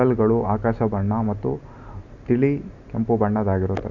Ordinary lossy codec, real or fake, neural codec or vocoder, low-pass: none; real; none; 7.2 kHz